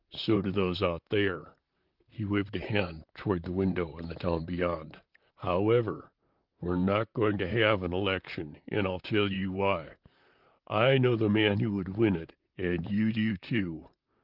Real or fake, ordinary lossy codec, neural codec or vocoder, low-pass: fake; Opus, 24 kbps; vocoder, 44.1 kHz, 128 mel bands, Pupu-Vocoder; 5.4 kHz